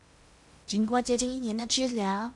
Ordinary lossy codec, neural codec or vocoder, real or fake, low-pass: MP3, 96 kbps; codec, 16 kHz in and 24 kHz out, 0.8 kbps, FocalCodec, streaming, 65536 codes; fake; 10.8 kHz